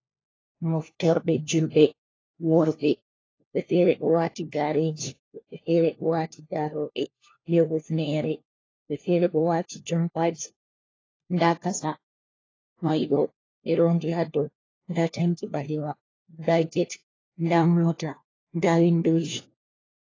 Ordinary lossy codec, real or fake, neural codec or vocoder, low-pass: AAC, 32 kbps; fake; codec, 16 kHz, 1 kbps, FunCodec, trained on LibriTTS, 50 frames a second; 7.2 kHz